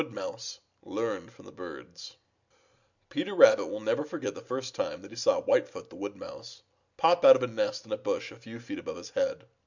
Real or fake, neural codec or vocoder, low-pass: real; none; 7.2 kHz